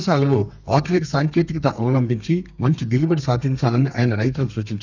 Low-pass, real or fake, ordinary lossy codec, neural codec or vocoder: 7.2 kHz; fake; none; codec, 32 kHz, 1.9 kbps, SNAC